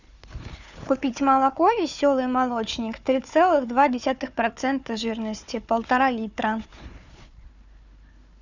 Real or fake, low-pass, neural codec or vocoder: fake; 7.2 kHz; codec, 16 kHz, 4 kbps, FunCodec, trained on Chinese and English, 50 frames a second